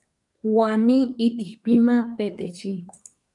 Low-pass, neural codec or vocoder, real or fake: 10.8 kHz; codec, 24 kHz, 1 kbps, SNAC; fake